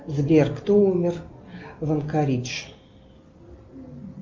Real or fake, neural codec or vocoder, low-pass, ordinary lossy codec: real; none; 7.2 kHz; Opus, 24 kbps